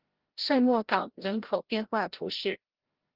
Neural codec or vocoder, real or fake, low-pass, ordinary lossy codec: codec, 16 kHz, 0.5 kbps, FreqCodec, larger model; fake; 5.4 kHz; Opus, 16 kbps